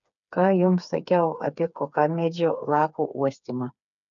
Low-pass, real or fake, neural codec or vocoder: 7.2 kHz; fake; codec, 16 kHz, 4 kbps, FreqCodec, smaller model